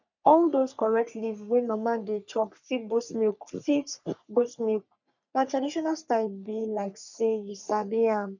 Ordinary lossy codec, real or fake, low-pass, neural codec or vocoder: none; fake; 7.2 kHz; codec, 44.1 kHz, 3.4 kbps, Pupu-Codec